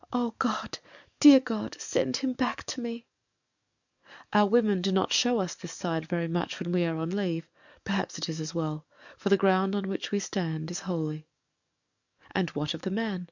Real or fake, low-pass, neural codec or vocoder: fake; 7.2 kHz; codec, 44.1 kHz, 7.8 kbps, DAC